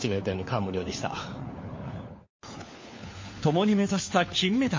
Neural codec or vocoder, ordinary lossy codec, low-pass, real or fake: codec, 16 kHz, 4 kbps, FunCodec, trained on LibriTTS, 50 frames a second; MP3, 32 kbps; 7.2 kHz; fake